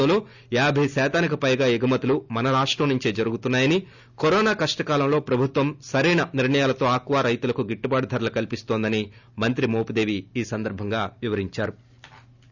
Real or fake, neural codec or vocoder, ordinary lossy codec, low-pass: real; none; none; 7.2 kHz